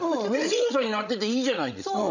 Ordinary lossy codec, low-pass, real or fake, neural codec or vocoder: none; 7.2 kHz; fake; codec, 16 kHz, 16 kbps, FreqCodec, larger model